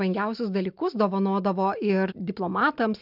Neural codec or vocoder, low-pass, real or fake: none; 5.4 kHz; real